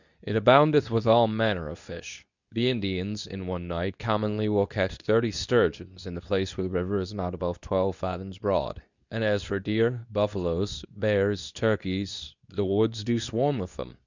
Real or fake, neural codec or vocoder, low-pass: fake; codec, 24 kHz, 0.9 kbps, WavTokenizer, medium speech release version 2; 7.2 kHz